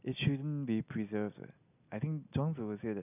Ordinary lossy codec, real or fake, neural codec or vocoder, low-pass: none; real; none; 3.6 kHz